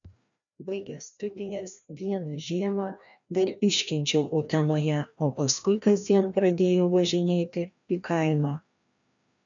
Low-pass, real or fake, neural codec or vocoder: 7.2 kHz; fake; codec, 16 kHz, 1 kbps, FreqCodec, larger model